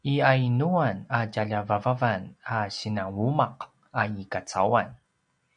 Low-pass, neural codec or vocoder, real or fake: 9.9 kHz; none; real